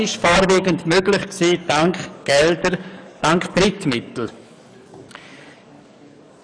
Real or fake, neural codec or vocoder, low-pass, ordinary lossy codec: fake; codec, 44.1 kHz, 7.8 kbps, DAC; 9.9 kHz; none